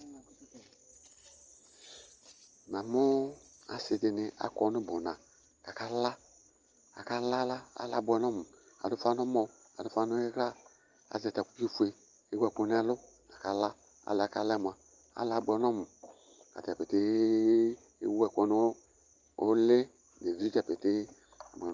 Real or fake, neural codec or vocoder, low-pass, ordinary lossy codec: real; none; 7.2 kHz; Opus, 24 kbps